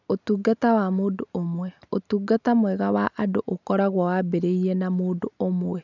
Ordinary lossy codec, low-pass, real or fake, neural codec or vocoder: none; 7.2 kHz; real; none